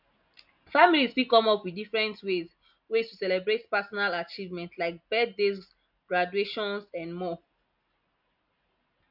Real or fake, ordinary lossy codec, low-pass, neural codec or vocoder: real; MP3, 48 kbps; 5.4 kHz; none